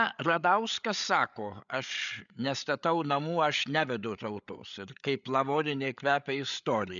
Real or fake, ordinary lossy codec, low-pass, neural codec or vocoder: fake; MP3, 96 kbps; 7.2 kHz; codec, 16 kHz, 8 kbps, FreqCodec, larger model